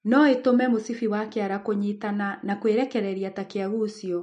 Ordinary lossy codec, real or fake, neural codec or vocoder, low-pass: MP3, 48 kbps; real; none; 9.9 kHz